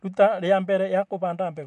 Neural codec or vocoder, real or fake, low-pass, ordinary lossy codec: none; real; 10.8 kHz; none